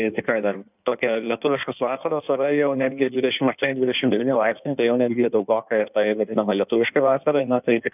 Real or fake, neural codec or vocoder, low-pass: fake; codec, 16 kHz in and 24 kHz out, 1.1 kbps, FireRedTTS-2 codec; 3.6 kHz